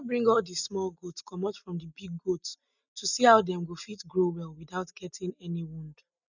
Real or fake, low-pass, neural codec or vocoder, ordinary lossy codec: real; 7.2 kHz; none; none